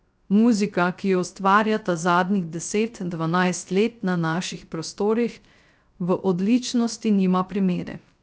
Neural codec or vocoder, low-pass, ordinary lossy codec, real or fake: codec, 16 kHz, 0.3 kbps, FocalCodec; none; none; fake